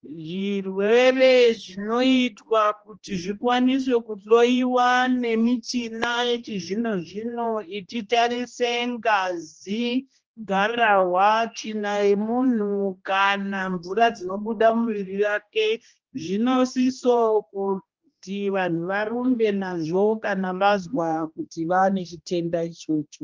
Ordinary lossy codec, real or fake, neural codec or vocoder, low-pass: Opus, 24 kbps; fake; codec, 16 kHz, 1 kbps, X-Codec, HuBERT features, trained on general audio; 7.2 kHz